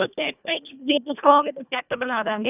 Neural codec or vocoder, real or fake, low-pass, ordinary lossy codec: codec, 24 kHz, 1.5 kbps, HILCodec; fake; 3.6 kHz; none